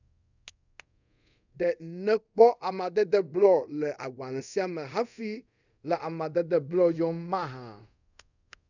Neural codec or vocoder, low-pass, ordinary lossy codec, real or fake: codec, 24 kHz, 0.5 kbps, DualCodec; 7.2 kHz; none; fake